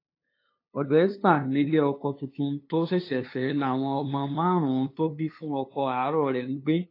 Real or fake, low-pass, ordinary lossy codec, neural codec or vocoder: fake; 5.4 kHz; AAC, 32 kbps; codec, 16 kHz, 2 kbps, FunCodec, trained on LibriTTS, 25 frames a second